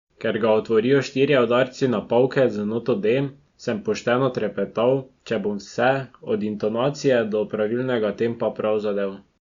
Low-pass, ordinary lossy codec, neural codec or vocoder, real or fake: 7.2 kHz; Opus, 64 kbps; none; real